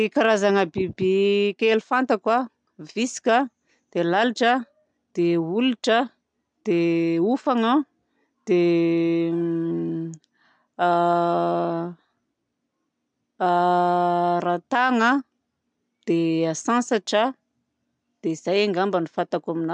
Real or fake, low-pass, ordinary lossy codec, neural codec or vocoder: real; 9.9 kHz; MP3, 96 kbps; none